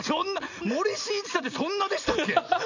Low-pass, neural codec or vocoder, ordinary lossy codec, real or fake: 7.2 kHz; none; none; real